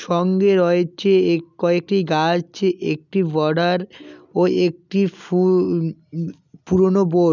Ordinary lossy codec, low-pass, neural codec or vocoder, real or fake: none; 7.2 kHz; none; real